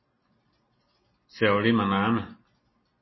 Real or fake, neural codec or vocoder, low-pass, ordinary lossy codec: real; none; 7.2 kHz; MP3, 24 kbps